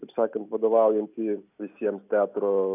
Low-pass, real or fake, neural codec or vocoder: 3.6 kHz; real; none